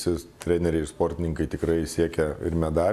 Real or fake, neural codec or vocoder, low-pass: real; none; 14.4 kHz